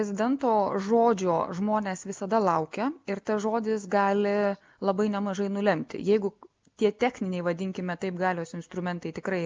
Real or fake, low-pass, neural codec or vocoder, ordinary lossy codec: real; 7.2 kHz; none; Opus, 24 kbps